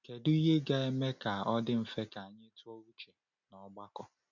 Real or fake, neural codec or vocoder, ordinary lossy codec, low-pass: real; none; none; 7.2 kHz